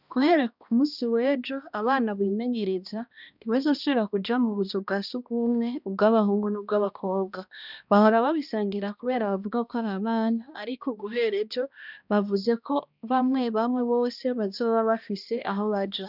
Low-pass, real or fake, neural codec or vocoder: 5.4 kHz; fake; codec, 16 kHz, 1 kbps, X-Codec, HuBERT features, trained on balanced general audio